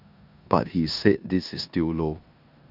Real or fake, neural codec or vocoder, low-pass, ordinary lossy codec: fake; codec, 16 kHz in and 24 kHz out, 0.9 kbps, LongCat-Audio-Codec, four codebook decoder; 5.4 kHz; none